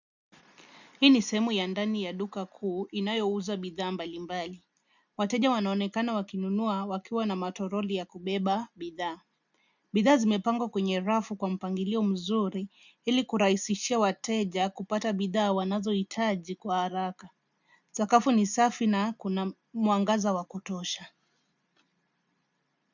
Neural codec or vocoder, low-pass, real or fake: none; 7.2 kHz; real